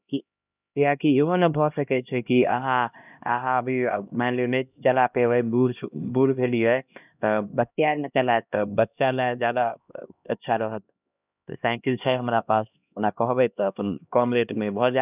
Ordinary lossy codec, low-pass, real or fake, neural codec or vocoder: none; 3.6 kHz; fake; codec, 16 kHz, 1 kbps, X-Codec, HuBERT features, trained on LibriSpeech